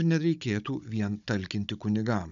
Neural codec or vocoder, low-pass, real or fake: codec, 16 kHz, 16 kbps, FunCodec, trained on Chinese and English, 50 frames a second; 7.2 kHz; fake